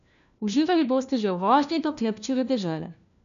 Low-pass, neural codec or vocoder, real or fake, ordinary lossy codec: 7.2 kHz; codec, 16 kHz, 1 kbps, FunCodec, trained on LibriTTS, 50 frames a second; fake; none